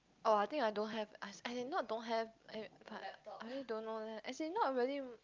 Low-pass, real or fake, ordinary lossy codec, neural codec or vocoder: 7.2 kHz; real; Opus, 24 kbps; none